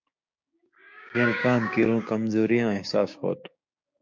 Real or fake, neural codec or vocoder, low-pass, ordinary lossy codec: fake; codec, 16 kHz, 6 kbps, DAC; 7.2 kHz; MP3, 64 kbps